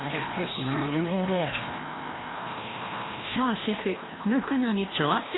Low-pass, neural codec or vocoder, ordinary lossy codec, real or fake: 7.2 kHz; codec, 16 kHz, 1 kbps, FreqCodec, larger model; AAC, 16 kbps; fake